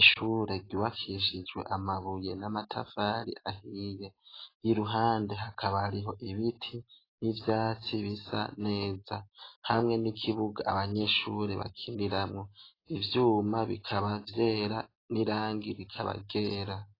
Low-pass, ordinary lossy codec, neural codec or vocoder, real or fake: 5.4 kHz; AAC, 24 kbps; none; real